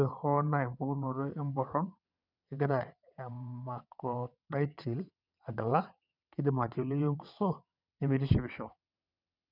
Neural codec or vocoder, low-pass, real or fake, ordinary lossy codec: vocoder, 22.05 kHz, 80 mel bands, WaveNeXt; 5.4 kHz; fake; none